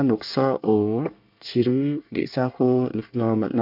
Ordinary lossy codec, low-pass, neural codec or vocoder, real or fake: MP3, 48 kbps; 5.4 kHz; codec, 24 kHz, 1 kbps, SNAC; fake